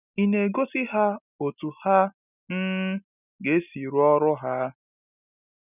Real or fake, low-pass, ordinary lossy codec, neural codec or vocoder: real; 3.6 kHz; none; none